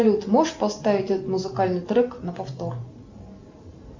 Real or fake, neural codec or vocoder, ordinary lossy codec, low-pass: real; none; MP3, 64 kbps; 7.2 kHz